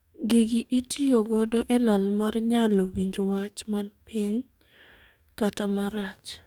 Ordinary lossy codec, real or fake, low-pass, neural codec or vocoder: none; fake; 19.8 kHz; codec, 44.1 kHz, 2.6 kbps, DAC